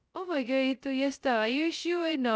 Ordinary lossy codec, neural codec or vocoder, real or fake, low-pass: none; codec, 16 kHz, 0.2 kbps, FocalCodec; fake; none